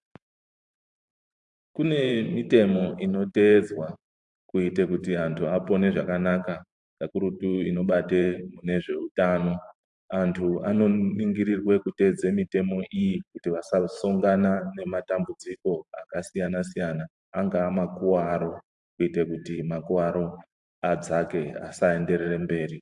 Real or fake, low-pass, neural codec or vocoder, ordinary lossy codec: fake; 10.8 kHz; vocoder, 44.1 kHz, 128 mel bands every 512 samples, BigVGAN v2; AAC, 64 kbps